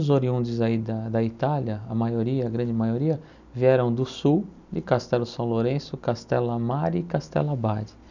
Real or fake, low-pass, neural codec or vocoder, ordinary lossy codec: real; 7.2 kHz; none; none